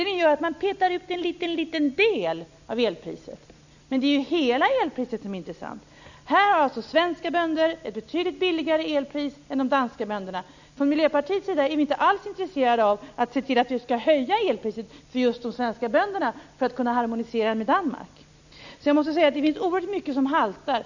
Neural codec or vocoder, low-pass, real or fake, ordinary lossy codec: none; 7.2 kHz; real; none